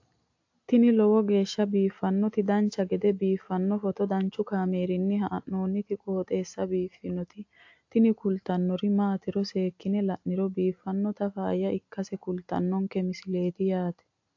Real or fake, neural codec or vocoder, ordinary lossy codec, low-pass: real; none; AAC, 48 kbps; 7.2 kHz